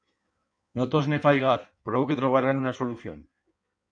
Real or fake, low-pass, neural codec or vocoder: fake; 9.9 kHz; codec, 16 kHz in and 24 kHz out, 1.1 kbps, FireRedTTS-2 codec